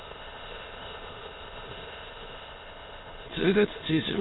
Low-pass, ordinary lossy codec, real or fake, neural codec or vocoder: 7.2 kHz; AAC, 16 kbps; fake; autoencoder, 22.05 kHz, a latent of 192 numbers a frame, VITS, trained on many speakers